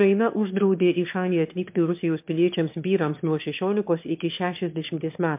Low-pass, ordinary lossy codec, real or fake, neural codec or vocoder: 3.6 kHz; MP3, 32 kbps; fake; autoencoder, 22.05 kHz, a latent of 192 numbers a frame, VITS, trained on one speaker